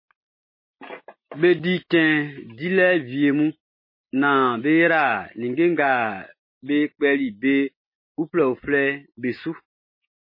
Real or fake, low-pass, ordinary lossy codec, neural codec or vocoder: real; 5.4 kHz; MP3, 24 kbps; none